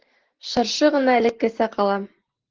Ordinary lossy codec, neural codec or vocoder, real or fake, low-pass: Opus, 16 kbps; none; real; 7.2 kHz